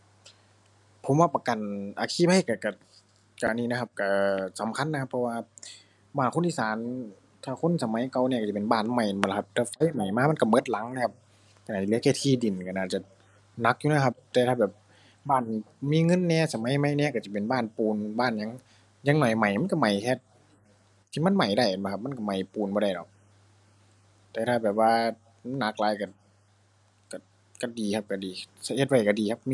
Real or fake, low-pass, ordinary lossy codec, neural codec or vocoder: real; none; none; none